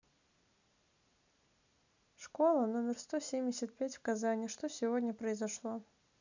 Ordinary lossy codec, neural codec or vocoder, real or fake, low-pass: none; none; real; 7.2 kHz